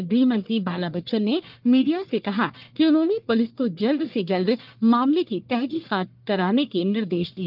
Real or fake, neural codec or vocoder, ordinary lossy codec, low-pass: fake; codec, 44.1 kHz, 1.7 kbps, Pupu-Codec; Opus, 32 kbps; 5.4 kHz